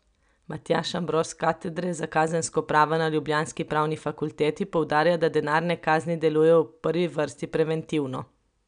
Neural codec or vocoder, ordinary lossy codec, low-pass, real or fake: none; none; 9.9 kHz; real